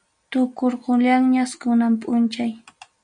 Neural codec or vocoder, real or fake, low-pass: none; real; 9.9 kHz